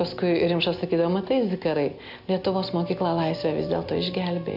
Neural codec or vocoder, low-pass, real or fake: none; 5.4 kHz; real